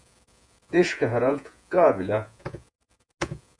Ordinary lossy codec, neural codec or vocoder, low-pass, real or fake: MP3, 96 kbps; vocoder, 48 kHz, 128 mel bands, Vocos; 9.9 kHz; fake